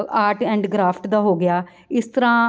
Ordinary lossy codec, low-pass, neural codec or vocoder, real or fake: none; none; none; real